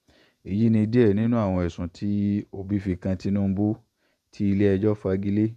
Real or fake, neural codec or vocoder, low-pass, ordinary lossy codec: fake; vocoder, 48 kHz, 128 mel bands, Vocos; 14.4 kHz; none